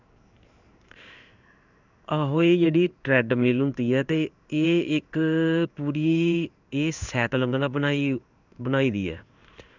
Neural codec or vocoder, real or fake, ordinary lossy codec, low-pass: codec, 16 kHz in and 24 kHz out, 1 kbps, XY-Tokenizer; fake; none; 7.2 kHz